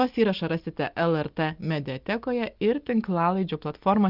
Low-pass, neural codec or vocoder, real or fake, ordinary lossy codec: 5.4 kHz; none; real; Opus, 24 kbps